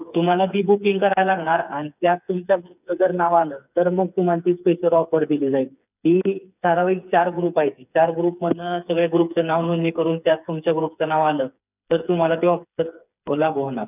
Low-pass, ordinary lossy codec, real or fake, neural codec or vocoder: 3.6 kHz; none; fake; codec, 16 kHz, 4 kbps, FreqCodec, smaller model